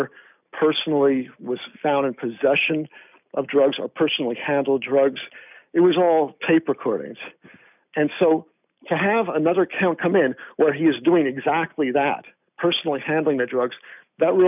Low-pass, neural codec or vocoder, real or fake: 3.6 kHz; none; real